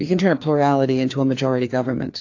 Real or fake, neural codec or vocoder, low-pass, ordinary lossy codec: fake; codec, 16 kHz, 2 kbps, FreqCodec, larger model; 7.2 kHz; AAC, 48 kbps